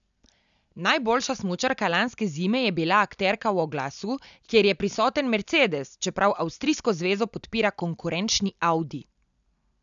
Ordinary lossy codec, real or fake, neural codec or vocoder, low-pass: none; real; none; 7.2 kHz